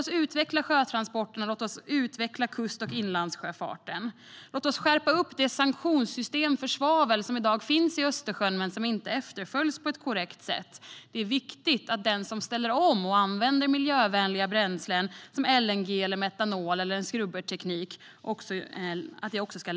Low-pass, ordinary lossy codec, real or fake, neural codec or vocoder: none; none; real; none